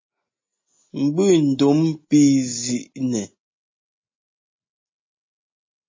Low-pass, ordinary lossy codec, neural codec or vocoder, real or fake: 7.2 kHz; MP3, 32 kbps; none; real